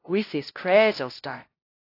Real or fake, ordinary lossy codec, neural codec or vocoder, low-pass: fake; AAC, 32 kbps; codec, 16 kHz, 0.5 kbps, FunCodec, trained on LibriTTS, 25 frames a second; 5.4 kHz